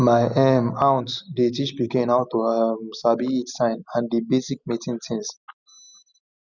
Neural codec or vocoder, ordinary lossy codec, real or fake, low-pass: none; none; real; 7.2 kHz